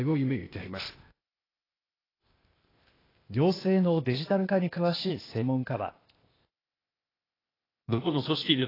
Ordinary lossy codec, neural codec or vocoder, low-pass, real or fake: AAC, 24 kbps; codec, 16 kHz, 0.8 kbps, ZipCodec; 5.4 kHz; fake